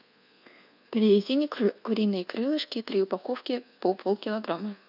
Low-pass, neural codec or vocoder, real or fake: 5.4 kHz; codec, 24 kHz, 1.2 kbps, DualCodec; fake